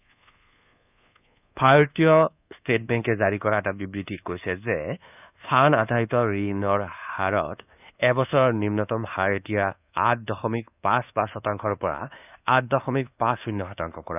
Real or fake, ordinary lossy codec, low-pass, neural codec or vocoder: fake; none; 3.6 kHz; codec, 24 kHz, 1.2 kbps, DualCodec